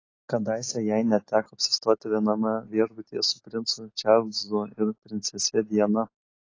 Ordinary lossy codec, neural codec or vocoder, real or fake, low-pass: AAC, 32 kbps; none; real; 7.2 kHz